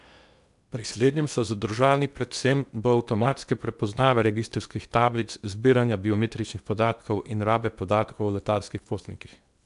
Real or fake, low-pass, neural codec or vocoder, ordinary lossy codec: fake; 10.8 kHz; codec, 16 kHz in and 24 kHz out, 0.8 kbps, FocalCodec, streaming, 65536 codes; MP3, 96 kbps